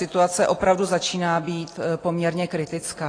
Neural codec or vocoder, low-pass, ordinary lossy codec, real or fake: none; 9.9 kHz; AAC, 32 kbps; real